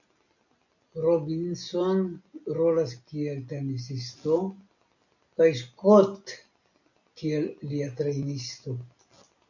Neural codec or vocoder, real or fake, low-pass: none; real; 7.2 kHz